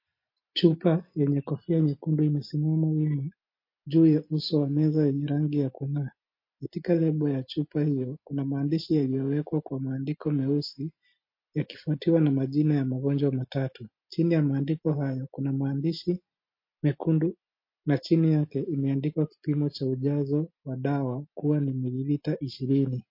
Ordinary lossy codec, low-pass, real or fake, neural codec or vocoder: MP3, 32 kbps; 5.4 kHz; real; none